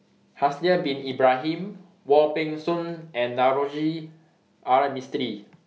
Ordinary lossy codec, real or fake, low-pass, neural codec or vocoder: none; real; none; none